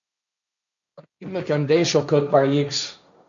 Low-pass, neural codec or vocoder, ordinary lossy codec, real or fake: 7.2 kHz; codec, 16 kHz, 1.1 kbps, Voila-Tokenizer; none; fake